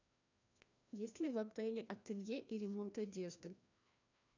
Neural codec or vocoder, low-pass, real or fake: codec, 16 kHz, 1 kbps, FreqCodec, larger model; 7.2 kHz; fake